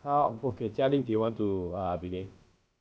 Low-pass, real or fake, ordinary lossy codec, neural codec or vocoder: none; fake; none; codec, 16 kHz, about 1 kbps, DyCAST, with the encoder's durations